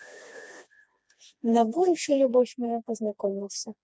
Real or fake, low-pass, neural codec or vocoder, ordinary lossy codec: fake; none; codec, 16 kHz, 2 kbps, FreqCodec, smaller model; none